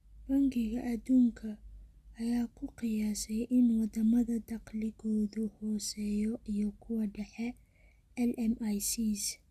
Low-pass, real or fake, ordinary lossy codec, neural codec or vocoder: 14.4 kHz; real; Opus, 64 kbps; none